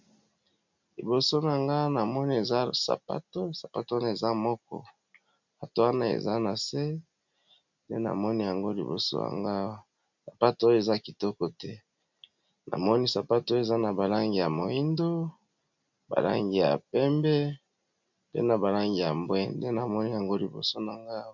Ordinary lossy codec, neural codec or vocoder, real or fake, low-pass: MP3, 64 kbps; none; real; 7.2 kHz